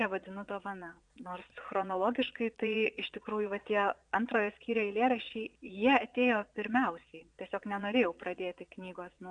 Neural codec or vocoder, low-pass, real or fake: vocoder, 22.05 kHz, 80 mel bands, Vocos; 9.9 kHz; fake